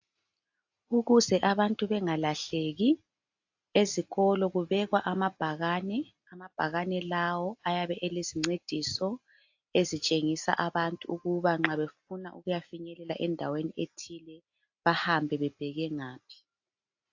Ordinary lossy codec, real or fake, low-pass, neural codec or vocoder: AAC, 48 kbps; real; 7.2 kHz; none